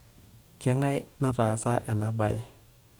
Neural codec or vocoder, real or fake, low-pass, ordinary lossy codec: codec, 44.1 kHz, 2.6 kbps, DAC; fake; none; none